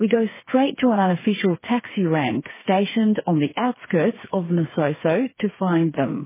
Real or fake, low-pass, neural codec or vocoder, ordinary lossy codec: fake; 3.6 kHz; codec, 16 kHz, 4 kbps, FreqCodec, smaller model; MP3, 16 kbps